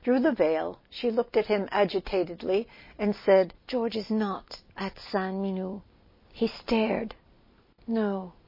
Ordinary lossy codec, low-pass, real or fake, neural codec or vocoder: MP3, 24 kbps; 5.4 kHz; real; none